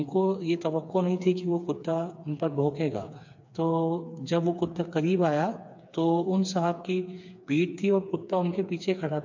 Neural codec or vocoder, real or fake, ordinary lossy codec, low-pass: codec, 16 kHz, 4 kbps, FreqCodec, smaller model; fake; MP3, 48 kbps; 7.2 kHz